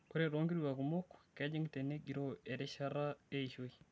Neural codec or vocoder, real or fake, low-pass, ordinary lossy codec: none; real; none; none